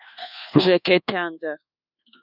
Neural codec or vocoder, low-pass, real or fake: codec, 24 kHz, 1.2 kbps, DualCodec; 5.4 kHz; fake